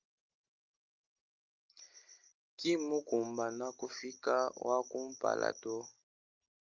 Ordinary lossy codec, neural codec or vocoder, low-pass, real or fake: Opus, 32 kbps; none; 7.2 kHz; real